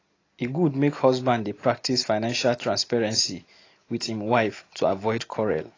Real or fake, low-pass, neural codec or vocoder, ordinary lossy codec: real; 7.2 kHz; none; AAC, 32 kbps